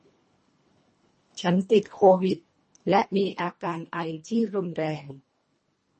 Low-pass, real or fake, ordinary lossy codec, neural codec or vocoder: 10.8 kHz; fake; MP3, 32 kbps; codec, 24 kHz, 1.5 kbps, HILCodec